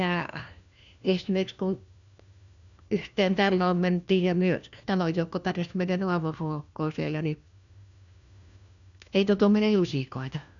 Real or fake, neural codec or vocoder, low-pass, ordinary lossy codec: fake; codec, 16 kHz, 1 kbps, FunCodec, trained on LibriTTS, 50 frames a second; 7.2 kHz; none